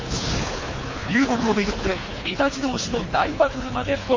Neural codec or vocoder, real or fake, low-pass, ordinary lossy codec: codec, 24 kHz, 3 kbps, HILCodec; fake; 7.2 kHz; MP3, 32 kbps